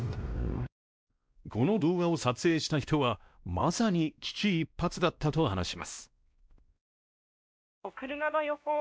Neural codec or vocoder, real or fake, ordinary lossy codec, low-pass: codec, 16 kHz, 1 kbps, X-Codec, WavLM features, trained on Multilingual LibriSpeech; fake; none; none